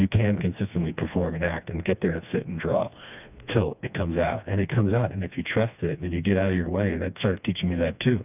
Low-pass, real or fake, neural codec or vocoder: 3.6 kHz; fake; codec, 16 kHz, 2 kbps, FreqCodec, smaller model